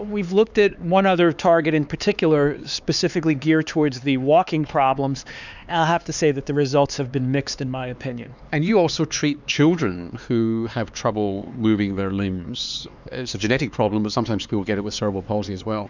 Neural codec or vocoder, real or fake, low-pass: codec, 16 kHz, 4 kbps, X-Codec, HuBERT features, trained on LibriSpeech; fake; 7.2 kHz